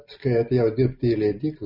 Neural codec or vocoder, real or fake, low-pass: none; real; 5.4 kHz